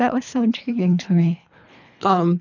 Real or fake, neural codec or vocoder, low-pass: fake; codec, 24 kHz, 3 kbps, HILCodec; 7.2 kHz